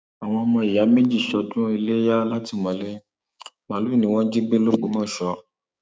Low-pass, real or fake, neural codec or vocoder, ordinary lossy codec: none; fake; codec, 16 kHz, 6 kbps, DAC; none